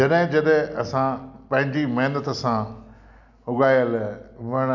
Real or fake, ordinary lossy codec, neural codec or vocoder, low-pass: real; none; none; 7.2 kHz